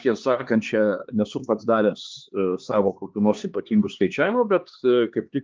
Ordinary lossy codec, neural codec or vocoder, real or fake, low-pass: Opus, 32 kbps; codec, 16 kHz, 2 kbps, X-Codec, HuBERT features, trained on LibriSpeech; fake; 7.2 kHz